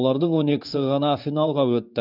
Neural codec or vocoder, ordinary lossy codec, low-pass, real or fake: codec, 16 kHz in and 24 kHz out, 1 kbps, XY-Tokenizer; none; 5.4 kHz; fake